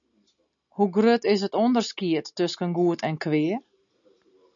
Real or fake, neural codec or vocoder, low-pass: real; none; 7.2 kHz